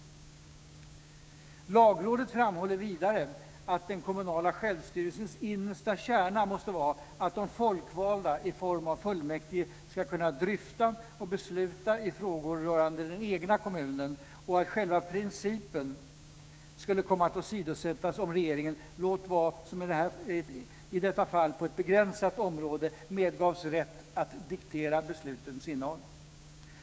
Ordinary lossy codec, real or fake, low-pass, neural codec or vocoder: none; fake; none; codec, 16 kHz, 6 kbps, DAC